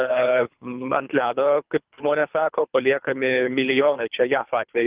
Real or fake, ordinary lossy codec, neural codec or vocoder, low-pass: fake; Opus, 32 kbps; codec, 24 kHz, 3 kbps, HILCodec; 3.6 kHz